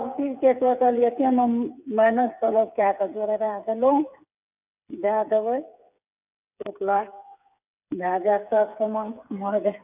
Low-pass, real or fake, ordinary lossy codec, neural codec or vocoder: 3.6 kHz; fake; none; codec, 16 kHz, 8 kbps, FreqCodec, smaller model